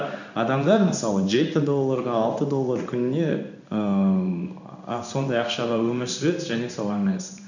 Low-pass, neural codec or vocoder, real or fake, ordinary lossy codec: 7.2 kHz; codec, 16 kHz in and 24 kHz out, 1 kbps, XY-Tokenizer; fake; none